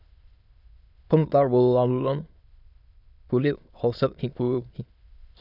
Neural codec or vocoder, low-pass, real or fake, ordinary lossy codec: autoencoder, 22.05 kHz, a latent of 192 numbers a frame, VITS, trained on many speakers; 5.4 kHz; fake; none